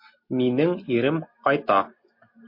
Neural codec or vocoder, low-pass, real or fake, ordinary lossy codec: none; 5.4 kHz; real; MP3, 32 kbps